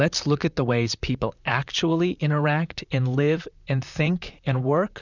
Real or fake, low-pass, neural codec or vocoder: fake; 7.2 kHz; vocoder, 44.1 kHz, 128 mel bands, Pupu-Vocoder